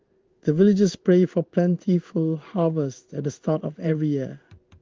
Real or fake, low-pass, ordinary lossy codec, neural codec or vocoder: fake; 7.2 kHz; Opus, 32 kbps; codec, 16 kHz in and 24 kHz out, 1 kbps, XY-Tokenizer